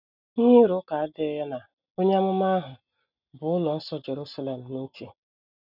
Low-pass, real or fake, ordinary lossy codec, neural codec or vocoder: 5.4 kHz; real; none; none